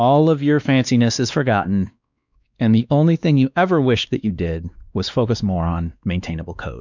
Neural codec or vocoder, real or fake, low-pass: codec, 16 kHz, 2 kbps, X-Codec, WavLM features, trained on Multilingual LibriSpeech; fake; 7.2 kHz